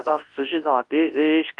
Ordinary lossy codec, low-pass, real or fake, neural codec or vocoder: Opus, 24 kbps; 10.8 kHz; fake; codec, 24 kHz, 0.9 kbps, WavTokenizer, large speech release